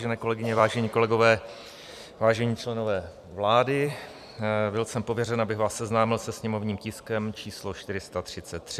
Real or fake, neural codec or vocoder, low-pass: fake; vocoder, 44.1 kHz, 128 mel bands every 256 samples, BigVGAN v2; 14.4 kHz